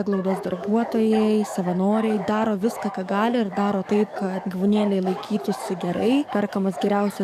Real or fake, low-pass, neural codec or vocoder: fake; 14.4 kHz; codec, 44.1 kHz, 7.8 kbps, DAC